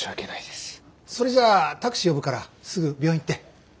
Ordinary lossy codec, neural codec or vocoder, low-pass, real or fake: none; none; none; real